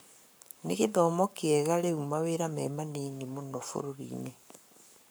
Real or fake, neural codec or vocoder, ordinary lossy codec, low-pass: fake; codec, 44.1 kHz, 7.8 kbps, Pupu-Codec; none; none